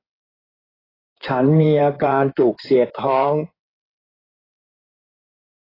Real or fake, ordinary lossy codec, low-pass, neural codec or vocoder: fake; AAC, 24 kbps; 5.4 kHz; codec, 16 kHz in and 24 kHz out, 2.2 kbps, FireRedTTS-2 codec